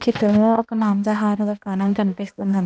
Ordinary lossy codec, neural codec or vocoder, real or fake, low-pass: none; codec, 16 kHz, 1 kbps, X-Codec, HuBERT features, trained on balanced general audio; fake; none